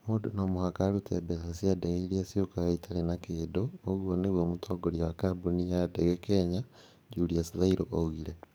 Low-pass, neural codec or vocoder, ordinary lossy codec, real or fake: none; codec, 44.1 kHz, 7.8 kbps, DAC; none; fake